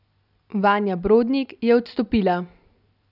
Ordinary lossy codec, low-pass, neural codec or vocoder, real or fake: none; 5.4 kHz; none; real